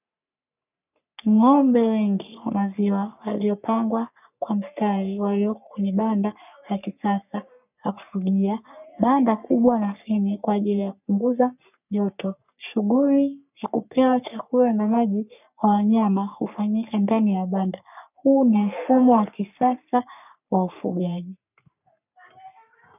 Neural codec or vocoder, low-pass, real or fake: codec, 44.1 kHz, 3.4 kbps, Pupu-Codec; 3.6 kHz; fake